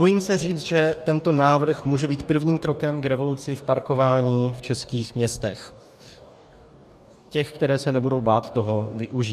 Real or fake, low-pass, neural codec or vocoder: fake; 14.4 kHz; codec, 44.1 kHz, 2.6 kbps, DAC